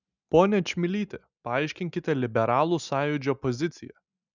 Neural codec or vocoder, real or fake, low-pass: none; real; 7.2 kHz